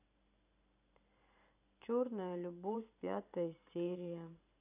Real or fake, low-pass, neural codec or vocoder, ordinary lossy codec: fake; 3.6 kHz; vocoder, 44.1 kHz, 128 mel bands every 512 samples, BigVGAN v2; none